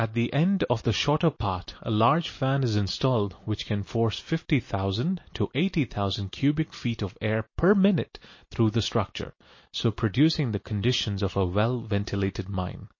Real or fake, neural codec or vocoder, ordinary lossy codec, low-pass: real; none; MP3, 32 kbps; 7.2 kHz